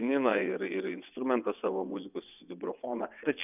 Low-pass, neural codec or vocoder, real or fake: 3.6 kHz; vocoder, 22.05 kHz, 80 mel bands, WaveNeXt; fake